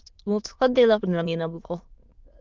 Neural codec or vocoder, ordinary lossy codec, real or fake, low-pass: autoencoder, 22.05 kHz, a latent of 192 numbers a frame, VITS, trained on many speakers; Opus, 32 kbps; fake; 7.2 kHz